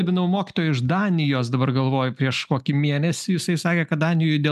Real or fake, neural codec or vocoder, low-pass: real; none; 14.4 kHz